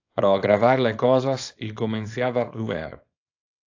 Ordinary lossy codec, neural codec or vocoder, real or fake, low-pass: AAC, 48 kbps; codec, 24 kHz, 0.9 kbps, WavTokenizer, small release; fake; 7.2 kHz